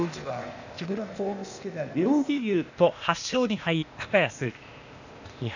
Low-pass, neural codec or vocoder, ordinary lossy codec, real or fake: 7.2 kHz; codec, 16 kHz, 0.8 kbps, ZipCodec; none; fake